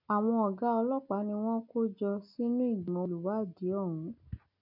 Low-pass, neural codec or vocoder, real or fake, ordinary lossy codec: 5.4 kHz; none; real; none